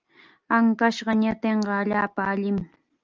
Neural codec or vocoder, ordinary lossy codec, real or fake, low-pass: none; Opus, 24 kbps; real; 7.2 kHz